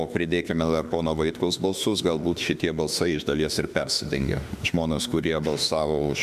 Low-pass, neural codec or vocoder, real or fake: 14.4 kHz; autoencoder, 48 kHz, 32 numbers a frame, DAC-VAE, trained on Japanese speech; fake